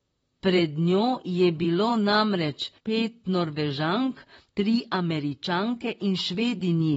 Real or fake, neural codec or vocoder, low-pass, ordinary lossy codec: fake; vocoder, 44.1 kHz, 128 mel bands, Pupu-Vocoder; 19.8 kHz; AAC, 24 kbps